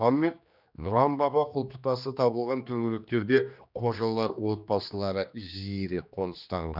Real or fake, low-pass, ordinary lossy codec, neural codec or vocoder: fake; 5.4 kHz; none; codec, 16 kHz, 2 kbps, X-Codec, HuBERT features, trained on general audio